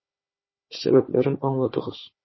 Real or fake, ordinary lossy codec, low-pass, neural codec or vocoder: fake; MP3, 24 kbps; 7.2 kHz; codec, 16 kHz, 4 kbps, FunCodec, trained on Chinese and English, 50 frames a second